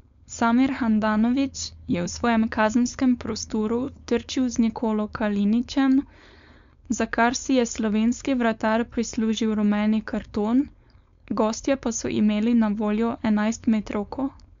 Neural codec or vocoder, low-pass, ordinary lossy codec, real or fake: codec, 16 kHz, 4.8 kbps, FACodec; 7.2 kHz; MP3, 64 kbps; fake